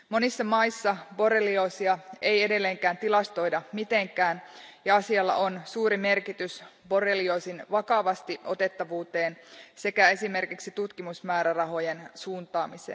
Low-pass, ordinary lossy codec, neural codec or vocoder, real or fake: none; none; none; real